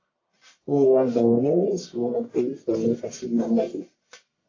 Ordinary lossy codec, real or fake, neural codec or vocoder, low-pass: AAC, 32 kbps; fake; codec, 44.1 kHz, 1.7 kbps, Pupu-Codec; 7.2 kHz